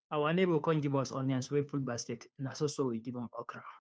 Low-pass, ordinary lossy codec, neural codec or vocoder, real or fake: none; none; codec, 16 kHz, 2 kbps, FunCodec, trained on Chinese and English, 25 frames a second; fake